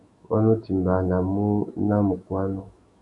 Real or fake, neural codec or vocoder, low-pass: fake; autoencoder, 48 kHz, 128 numbers a frame, DAC-VAE, trained on Japanese speech; 10.8 kHz